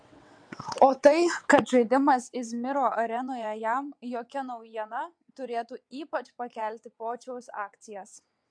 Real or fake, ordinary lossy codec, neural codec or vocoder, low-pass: fake; MP3, 64 kbps; vocoder, 22.05 kHz, 80 mel bands, WaveNeXt; 9.9 kHz